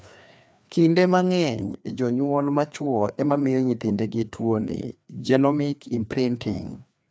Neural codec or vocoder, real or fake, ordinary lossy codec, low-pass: codec, 16 kHz, 2 kbps, FreqCodec, larger model; fake; none; none